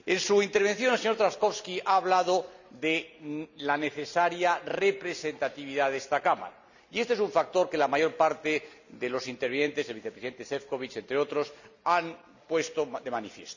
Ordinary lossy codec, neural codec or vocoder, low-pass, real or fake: none; none; 7.2 kHz; real